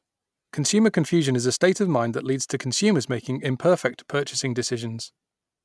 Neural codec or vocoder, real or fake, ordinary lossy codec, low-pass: none; real; none; none